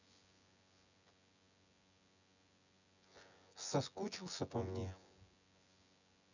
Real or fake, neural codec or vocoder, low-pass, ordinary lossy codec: fake; vocoder, 24 kHz, 100 mel bands, Vocos; 7.2 kHz; none